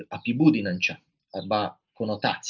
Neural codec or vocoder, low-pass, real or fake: none; 7.2 kHz; real